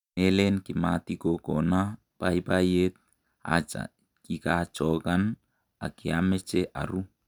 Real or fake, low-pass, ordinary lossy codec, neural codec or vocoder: real; 19.8 kHz; none; none